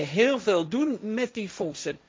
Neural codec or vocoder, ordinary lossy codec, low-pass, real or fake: codec, 16 kHz, 1.1 kbps, Voila-Tokenizer; none; none; fake